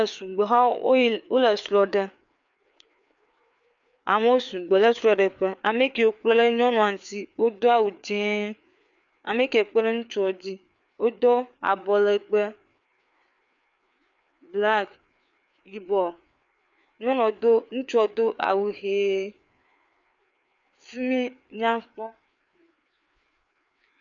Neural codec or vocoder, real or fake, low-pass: codec, 16 kHz, 4 kbps, FreqCodec, larger model; fake; 7.2 kHz